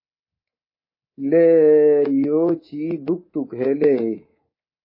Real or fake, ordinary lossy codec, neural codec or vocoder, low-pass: fake; MP3, 24 kbps; codec, 24 kHz, 3.1 kbps, DualCodec; 5.4 kHz